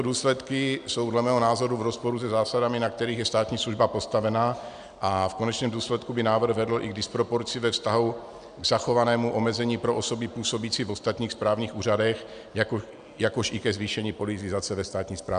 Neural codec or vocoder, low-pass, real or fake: none; 9.9 kHz; real